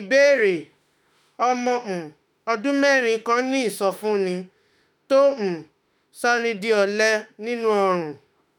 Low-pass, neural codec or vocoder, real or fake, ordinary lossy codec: 19.8 kHz; autoencoder, 48 kHz, 32 numbers a frame, DAC-VAE, trained on Japanese speech; fake; none